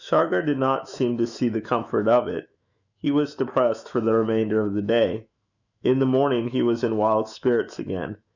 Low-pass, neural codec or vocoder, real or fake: 7.2 kHz; autoencoder, 48 kHz, 128 numbers a frame, DAC-VAE, trained on Japanese speech; fake